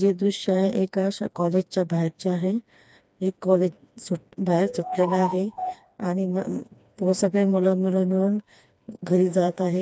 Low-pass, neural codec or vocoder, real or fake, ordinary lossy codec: none; codec, 16 kHz, 2 kbps, FreqCodec, smaller model; fake; none